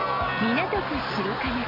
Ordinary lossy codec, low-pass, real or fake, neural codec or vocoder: MP3, 48 kbps; 5.4 kHz; real; none